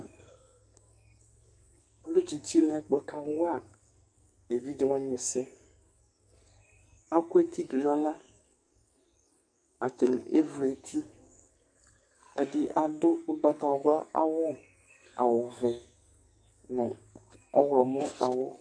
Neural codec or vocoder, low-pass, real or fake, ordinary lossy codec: codec, 44.1 kHz, 2.6 kbps, SNAC; 9.9 kHz; fake; MP3, 64 kbps